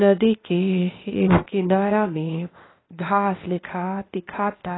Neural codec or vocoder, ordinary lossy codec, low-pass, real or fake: codec, 16 kHz, 0.8 kbps, ZipCodec; AAC, 16 kbps; 7.2 kHz; fake